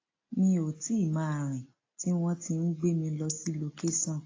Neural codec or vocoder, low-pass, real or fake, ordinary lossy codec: none; 7.2 kHz; real; AAC, 32 kbps